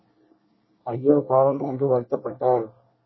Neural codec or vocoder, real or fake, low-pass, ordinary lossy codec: codec, 24 kHz, 1 kbps, SNAC; fake; 7.2 kHz; MP3, 24 kbps